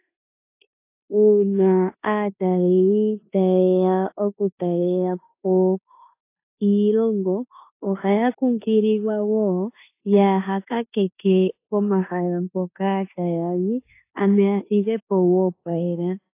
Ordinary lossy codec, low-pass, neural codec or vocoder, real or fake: AAC, 24 kbps; 3.6 kHz; codec, 16 kHz in and 24 kHz out, 0.9 kbps, LongCat-Audio-Codec, four codebook decoder; fake